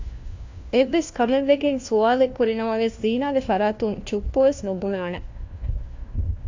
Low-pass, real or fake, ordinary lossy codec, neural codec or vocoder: 7.2 kHz; fake; AAC, 48 kbps; codec, 16 kHz, 1 kbps, FunCodec, trained on LibriTTS, 50 frames a second